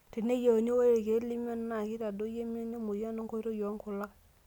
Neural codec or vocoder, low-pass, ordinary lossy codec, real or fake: none; 19.8 kHz; none; real